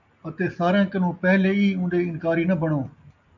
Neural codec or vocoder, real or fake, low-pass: none; real; 7.2 kHz